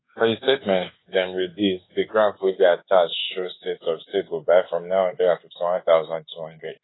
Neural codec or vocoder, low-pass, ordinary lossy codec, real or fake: codec, 24 kHz, 1.2 kbps, DualCodec; 7.2 kHz; AAC, 16 kbps; fake